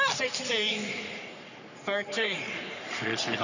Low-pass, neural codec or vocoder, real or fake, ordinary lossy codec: 7.2 kHz; codec, 44.1 kHz, 3.4 kbps, Pupu-Codec; fake; none